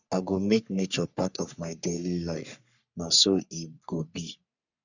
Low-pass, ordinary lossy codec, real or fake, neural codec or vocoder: 7.2 kHz; none; fake; codec, 44.1 kHz, 3.4 kbps, Pupu-Codec